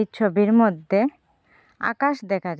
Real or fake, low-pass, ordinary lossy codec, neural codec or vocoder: real; none; none; none